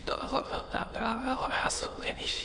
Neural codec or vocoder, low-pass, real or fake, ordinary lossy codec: autoencoder, 22.05 kHz, a latent of 192 numbers a frame, VITS, trained on many speakers; 9.9 kHz; fake; MP3, 64 kbps